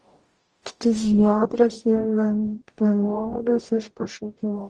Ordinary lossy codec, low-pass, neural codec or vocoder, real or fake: Opus, 24 kbps; 10.8 kHz; codec, 44.1 kHz, 0.9 kbps, DAC; fake